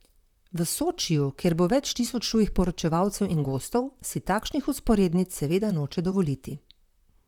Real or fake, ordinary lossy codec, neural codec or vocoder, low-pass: fake; none; vocoder, 44.1 kHz, 128 mel bands, Pupu-Vocoder; 19.8 kHz